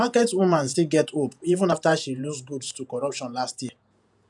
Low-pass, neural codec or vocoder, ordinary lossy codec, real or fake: 10.8 kHz; vocoder, 48 kHz, 128 mel bands, Vocos; none; fake